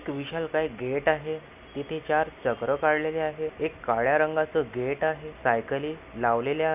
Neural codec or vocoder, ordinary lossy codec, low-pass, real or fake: none; none; 3.6 kHz; real